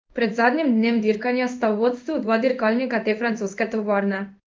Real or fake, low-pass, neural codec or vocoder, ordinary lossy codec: fake; 7.2 kHz; codec, 16 kHz in and 24 kHz out, 1 kbps, XY-Tokenizer; Opus, 24 kbps